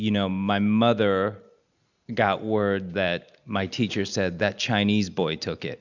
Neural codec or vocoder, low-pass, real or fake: none; 7.2 kHz; real